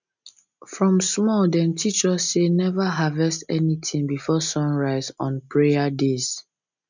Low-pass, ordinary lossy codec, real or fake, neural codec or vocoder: 7.2 kHz; none; real; none